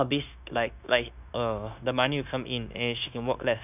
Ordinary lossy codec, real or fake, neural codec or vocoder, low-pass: none; fake; codec, 16 kHz, 0.9 kbps, LongCat-Audio-Codec; 3.6 kHz